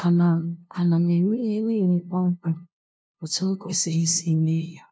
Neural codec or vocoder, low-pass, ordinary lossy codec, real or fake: codec, 16 kHz, 0.5 kbps, FunCodec, trained on LibriTTS, 25 frames a second; none; none; fake